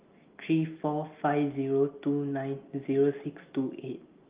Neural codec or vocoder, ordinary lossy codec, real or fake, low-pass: none; Opus, 32 kbps; real; 3.6 kHz